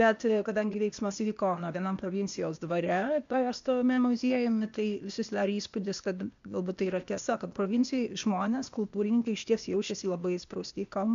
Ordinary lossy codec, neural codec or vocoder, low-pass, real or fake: MP3, 64 kbps; codec, 16 kHz, 0.8 kbps, ZipCodec; 7.2 kHz; fake